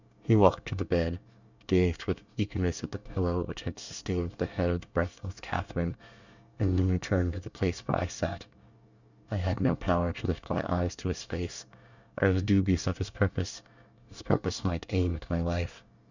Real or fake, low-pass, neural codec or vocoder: fake; 7.2 kHz; codec, 24 kHz, 1 kbps, SNAC